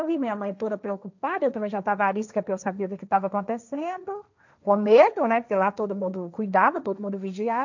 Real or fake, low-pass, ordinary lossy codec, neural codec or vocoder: fake; 7.2 kHz; none; codec, 16 kHz, 1.1 kbps, Voila-Tokenizer